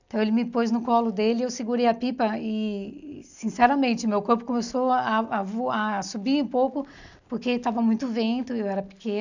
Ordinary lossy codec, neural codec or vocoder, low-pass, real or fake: none; none; 7.2 kHz; real